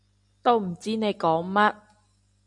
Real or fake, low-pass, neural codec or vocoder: real; 10.8 kHz; none